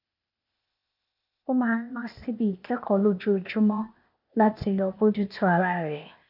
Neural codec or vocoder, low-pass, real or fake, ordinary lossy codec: codec, 16 kHz, 0.8 kbps, ZipCodec; 5.4 kHz; fake; none